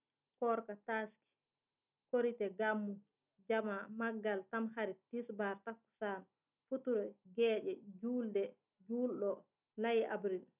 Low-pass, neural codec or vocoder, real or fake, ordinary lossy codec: 3.6 kHz; none; real; none